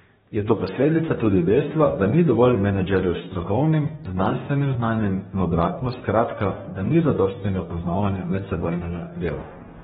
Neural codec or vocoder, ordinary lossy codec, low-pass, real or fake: codec, 44.1 kHz, 2.6 kbps, DAC; AAC, 16 kbps; 19.8 kHz; fake